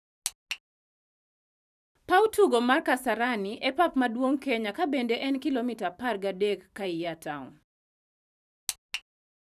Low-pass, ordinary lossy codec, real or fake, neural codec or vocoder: 14.4 kHz; none; fake; vocoder, 44.1 kHz, 128 mel bands every 512 samples, BigVGAN v2